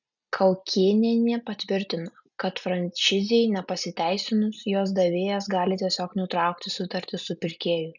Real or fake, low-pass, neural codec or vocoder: real; 7.2 kHz; none